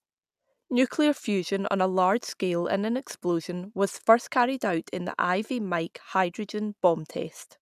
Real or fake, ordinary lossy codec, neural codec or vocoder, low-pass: real; none; none; 14.4 kHz